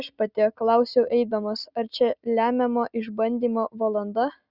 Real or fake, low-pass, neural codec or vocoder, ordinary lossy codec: real; 5.4 kHz; none; Opus, 64 kbps